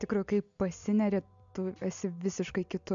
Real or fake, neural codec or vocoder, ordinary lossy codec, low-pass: real; none; MP3, 64 kbps; 7.2 kHz